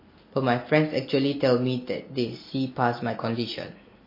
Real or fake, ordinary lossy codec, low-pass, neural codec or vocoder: real; MP3, 24 kbps; 5.4 kHz; none